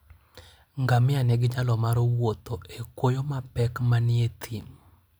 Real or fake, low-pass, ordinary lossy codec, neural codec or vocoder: real; none; none; none